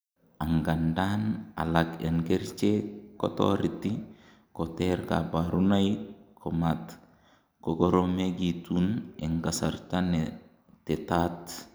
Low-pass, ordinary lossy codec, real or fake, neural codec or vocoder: none; none; real; none